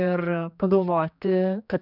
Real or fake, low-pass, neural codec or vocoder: fake; 5.4 kHz; codec, 16 kHz, 2 kbps, X-Codec, HuBERT features, trained on general audio